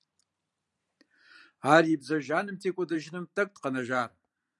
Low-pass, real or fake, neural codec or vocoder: 10.8 kHz; fake; vocoder, 44.1 kHz, 128 mel bands every 512 samples, BigVGAN v2